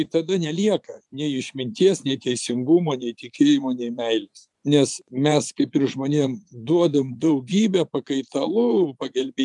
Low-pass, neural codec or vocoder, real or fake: 10.8 kHz; vocoder, 24 kHz, 100 mel bands, Vocos; fake